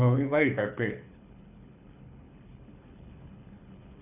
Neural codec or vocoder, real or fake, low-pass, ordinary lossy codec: codec, 24 kHz, 6 kbps, HILCodec; fake; 3.6 kHz; none